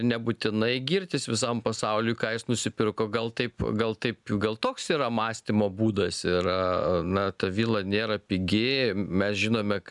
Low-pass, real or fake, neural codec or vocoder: 10.8 kHz; real; none